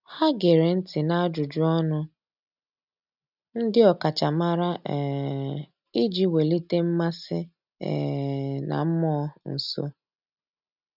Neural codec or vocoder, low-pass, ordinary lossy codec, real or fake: none; 5.4 kHz; none; real